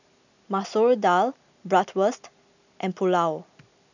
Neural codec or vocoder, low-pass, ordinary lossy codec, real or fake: none; 7.2 kHz; none; real